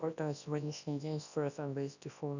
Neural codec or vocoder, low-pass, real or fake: codec, 24 kHz, 0.9 kbps, WavTokenizer, large speech release; 7.2 kHz; fake